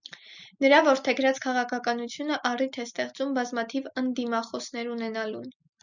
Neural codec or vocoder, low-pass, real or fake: none; 7.2 kHz; real